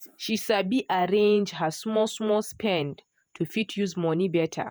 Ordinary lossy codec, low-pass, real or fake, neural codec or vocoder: none; none; fake; vocoder, 48 kHz, 128 mel bands, Vocos